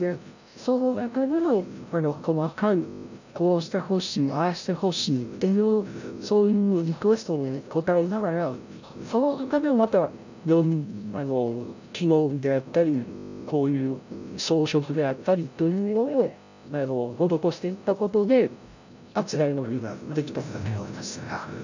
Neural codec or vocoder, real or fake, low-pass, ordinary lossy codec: codec, 16 kHz, 0.5 kbps, FreqCodec, larger model; fake; 7.2 kHz; none